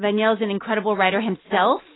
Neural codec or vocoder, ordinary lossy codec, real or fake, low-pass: none; AAC, 16 kbps; real; 7.2 kHz